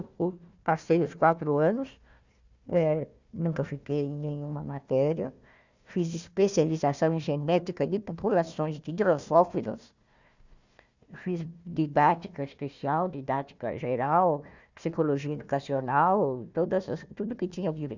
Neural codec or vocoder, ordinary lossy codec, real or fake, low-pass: codec, 16 kHz, 1 kbps, FunCodec, trained on Chinese and English, 50 frames a second; Opus, 64 kbps; fake; 7.2 kHz